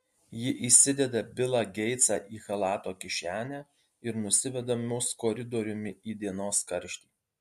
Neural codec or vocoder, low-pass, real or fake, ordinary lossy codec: none; 14.4 kHz; real; MP3, 64 kbps